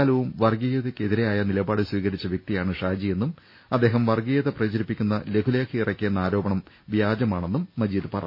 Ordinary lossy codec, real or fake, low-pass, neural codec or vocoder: MP3, 24 kbps; real; 5.4 kHz; none